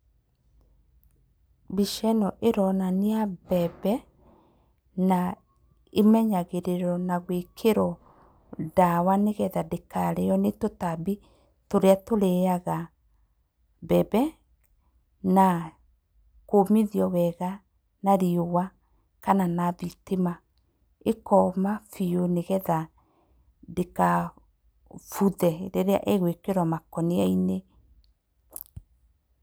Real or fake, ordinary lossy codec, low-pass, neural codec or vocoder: real; none; none; none